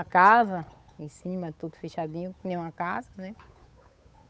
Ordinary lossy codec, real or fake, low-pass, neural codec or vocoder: none; fake; none; codec, 16 kHz, 8 kbps, FunCodec, trained on Chinese and English, 25 frames a second